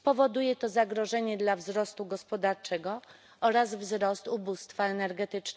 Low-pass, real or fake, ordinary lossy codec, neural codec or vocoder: none; real; none; none